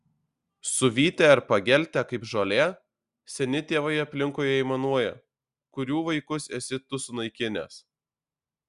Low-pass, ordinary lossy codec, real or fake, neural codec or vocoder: 10.8 kHz; Opus, 64 kbps; real; none